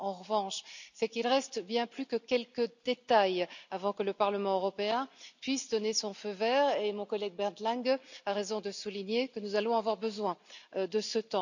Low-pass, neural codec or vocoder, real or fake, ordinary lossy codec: 7.2 kHz; none; real; none